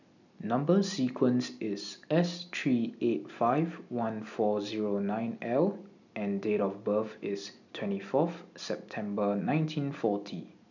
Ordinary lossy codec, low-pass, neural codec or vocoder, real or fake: none; 7.2 kHz; none; real